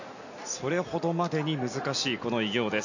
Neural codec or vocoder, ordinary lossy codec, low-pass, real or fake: none; none; 7.2 kHz; real